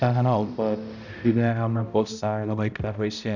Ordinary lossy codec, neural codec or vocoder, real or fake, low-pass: none; codec, 16 kHz, 0.5 kbps, X-Codec, HuBERT features, trained on balanced general audio; fake; 7.2 kHz